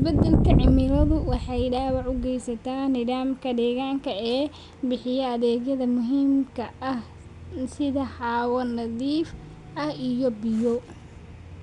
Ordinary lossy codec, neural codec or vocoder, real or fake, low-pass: none; none; real; 10.8 kHz